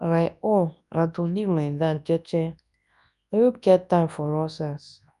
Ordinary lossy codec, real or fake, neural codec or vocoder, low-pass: none; fake; codec, 24 kHz, 0.9 kbps, WavTokenizer, large speech release; 10.8 kHz